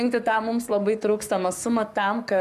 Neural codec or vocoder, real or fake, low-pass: codec, 44.1 kHz, 7.8 kbps, Pupu-Codec; fake; 14.4 kHz